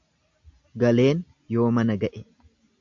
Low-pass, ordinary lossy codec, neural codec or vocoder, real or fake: 7.2 kHz; AAC, 64 kbps; none; real